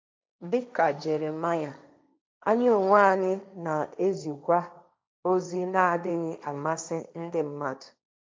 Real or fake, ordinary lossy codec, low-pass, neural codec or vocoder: fake; none; none; codec, 16 kHz, 1.1 kbps, Voila-Tokenizer